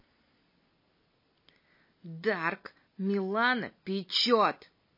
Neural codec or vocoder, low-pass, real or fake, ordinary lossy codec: none; 5.4 kHz; real; MP3, 24 kbps